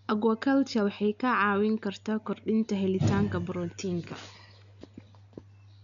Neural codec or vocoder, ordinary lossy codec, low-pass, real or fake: none; none; 7.2 kHz; real